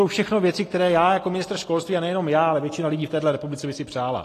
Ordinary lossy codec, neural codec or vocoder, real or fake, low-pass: AAC, 48 kbps; none; real; 14.4 kHz